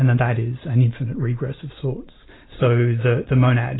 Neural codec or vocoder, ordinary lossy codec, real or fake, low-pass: none; AAC, 16 kbps; real; 7.2 kHz